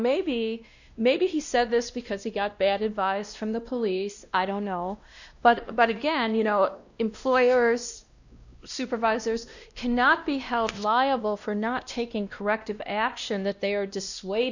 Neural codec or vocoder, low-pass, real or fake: codec, 16 kHz, 1 kbps, X-Codec, WavLM features, trained on Multilingual LibriSpeech; 7.2 kHz; fake